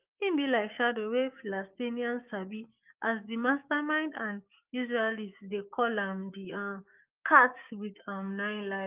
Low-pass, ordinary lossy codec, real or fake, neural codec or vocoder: 3.6 kHz; Opus, 24 kbps; fake; codec, 16 kHz, 6 kbps, DAC